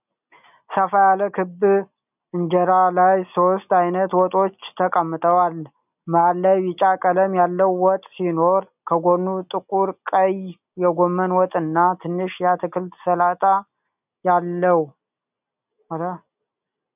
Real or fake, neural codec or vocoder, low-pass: real; none; 3.6 kHz